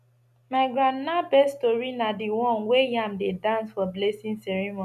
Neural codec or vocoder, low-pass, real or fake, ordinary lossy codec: none; 14.4 kHz; real; none